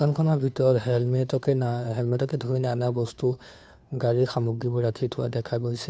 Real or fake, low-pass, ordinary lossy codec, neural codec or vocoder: fake; none; none; codec, 16 kHz, 2 kbps, FunCodec, trained on Chinese and English, 25 frames a second